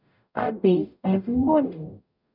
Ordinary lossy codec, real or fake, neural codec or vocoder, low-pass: none; fake; codec, 44.1 kHz, 0.9 kbps, DAC; 5.4 kHz